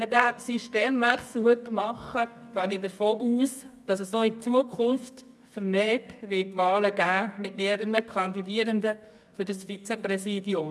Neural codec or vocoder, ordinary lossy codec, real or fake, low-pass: codec, 24 kHz, 0.9 kbps, WavTokenizer, medium music audio release; none; fake; none